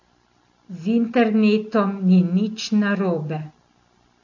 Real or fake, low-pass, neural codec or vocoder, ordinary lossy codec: real; 7.2 kHz; none; AAC, 48 kbps